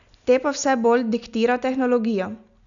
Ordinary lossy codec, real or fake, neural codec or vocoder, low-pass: none; real; none; 7.2 kHz